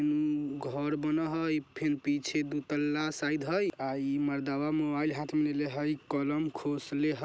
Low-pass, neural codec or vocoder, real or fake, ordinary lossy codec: none; none; real; none